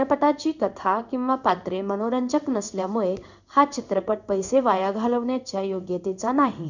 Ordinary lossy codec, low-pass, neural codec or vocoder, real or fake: none; 7.2 kHz; codec, 16 kHz in and 24 kHz out, 1 kbps, XY-Tokenizer; fake